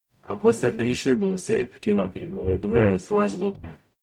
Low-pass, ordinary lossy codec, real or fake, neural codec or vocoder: 19.8 kHz; none; fake; codec, 44.1 kHz, 0.9 kbps, DAC